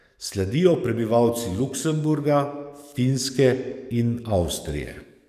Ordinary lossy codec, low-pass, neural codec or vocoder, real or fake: none; 14.4 kHz; codec, 44.1 kHz, 7.8 kbps, DAC; fake